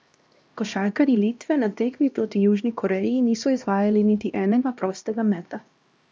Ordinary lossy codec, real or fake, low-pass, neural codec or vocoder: none; fake; none; codec, 16 kHz, 1 kbps, X-Codec, HuBERT features, trained on LibriSpeech